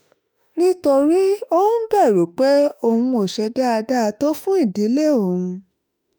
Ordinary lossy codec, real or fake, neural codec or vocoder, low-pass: none; fake; autoencoder, 48 kHz, 32 numbers a frame, DAC-VAE, trained on Japanese speech; none